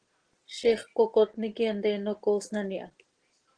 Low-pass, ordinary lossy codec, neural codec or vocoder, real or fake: 9.9 kHz; Opus, 16 kbps; none; real